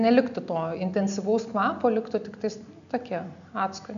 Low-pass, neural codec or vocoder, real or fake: 7.2 kHz; none; real